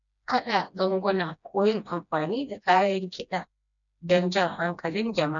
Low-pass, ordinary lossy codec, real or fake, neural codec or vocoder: 7.2 kHz; none; fake; codec, 16 kHz, 1 kbps, FreqCodec, smaller model